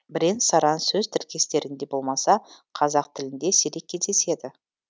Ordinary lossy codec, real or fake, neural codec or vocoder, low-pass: none; real; none; none